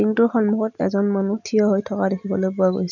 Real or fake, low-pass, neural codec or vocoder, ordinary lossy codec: real; 7.2 kHz; none; none